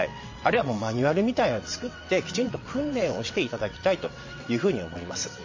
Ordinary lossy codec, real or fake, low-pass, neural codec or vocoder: MP3, 32 kbps; fake; 7.2 kHz; vocoder, 22.05 kHz, 80 mel bands, WaveNeXt